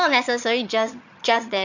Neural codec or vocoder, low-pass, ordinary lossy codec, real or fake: vocoder, 22.05 kHz, 80 mel bands, HiFi-GAN; 7.2 kHz; none; fake